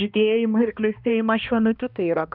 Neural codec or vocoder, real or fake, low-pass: codec, 16 kHz, 1 kbps, X-Codec, HuBERT features, trained on balanced general audio; fake; 5.4 kHz